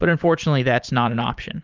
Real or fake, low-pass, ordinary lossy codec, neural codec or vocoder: fake; 7.2 kHz; Opus, 24 kbps; vocoder, 44.1 kHz, 128 mel bands every 512 samples, BigVGAN v2